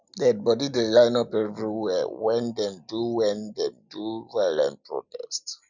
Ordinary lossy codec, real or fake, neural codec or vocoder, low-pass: none; real; none; 7.2 kHz